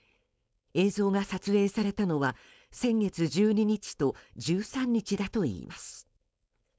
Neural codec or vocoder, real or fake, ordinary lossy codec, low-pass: codec, 16 kHz, 4.8 kbps, FACodec; fake; none; none